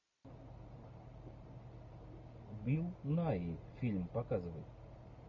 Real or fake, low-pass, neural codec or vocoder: real; 7.2 kHz; none